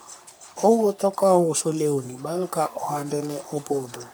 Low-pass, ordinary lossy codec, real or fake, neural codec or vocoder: none; none; fake; codec, 44.1 kHz, 3.4 kbps, Pupu-Codec